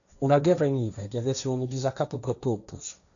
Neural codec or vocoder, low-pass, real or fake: codec, 16 kHz, 1.1 kbps, Voila-Tokenizer; 7.2 kHz; fake